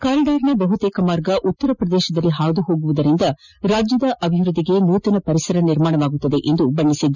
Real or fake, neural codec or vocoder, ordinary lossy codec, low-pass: real; none; none; 7.2 kHz